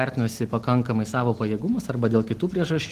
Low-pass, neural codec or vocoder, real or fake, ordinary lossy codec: 14.4 kHz; none; real; Opus, 16 kbps